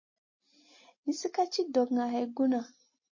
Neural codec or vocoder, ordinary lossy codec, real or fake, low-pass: none; MP3, 32 kbps; real; 7.2 kHz